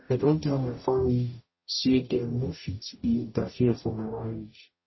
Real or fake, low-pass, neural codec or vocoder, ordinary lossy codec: fake; 7.2 kHz; codec, 44.1 kHz, 0.9 kbps, DAC; MP3, 24 kbps